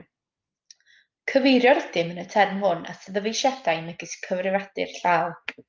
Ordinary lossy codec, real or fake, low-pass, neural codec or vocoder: Opus, 32 kbps; real; 7.2 kHz; none